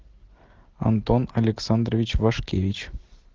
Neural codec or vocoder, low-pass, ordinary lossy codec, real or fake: none; 7.2 kHz; Opus, 16 kbps; real